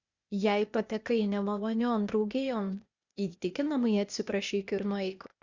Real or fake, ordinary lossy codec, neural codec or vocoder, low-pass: fake; Opus, 64 kbps; codec, 16 kHz, 0.8 kbps, ZipCodec; 7.2 kHz